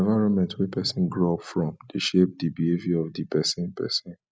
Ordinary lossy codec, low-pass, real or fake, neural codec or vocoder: none; none; real; none